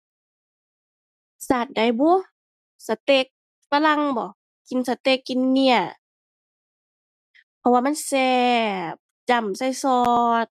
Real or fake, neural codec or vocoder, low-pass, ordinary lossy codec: real; none; 14.4 kHz; none